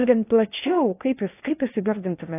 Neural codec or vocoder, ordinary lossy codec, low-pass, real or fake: codec, 16 kHz in and 24 kHz out, 0.8 kbps, FocalCodec, streaming, 65536 codes; AAC, 24 kbps; 3.6 kHz; fake